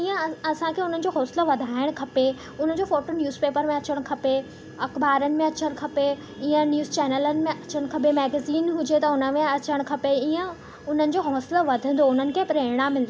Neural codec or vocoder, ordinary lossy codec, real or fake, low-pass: none; none; real; none